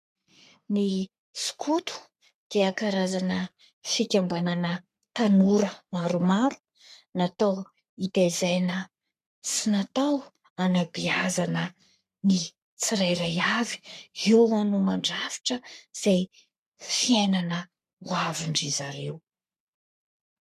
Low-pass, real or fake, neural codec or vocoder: 14.4 kHz; fake; codec, 44.1 kHz, 3.4 kbps, Pupu-Codec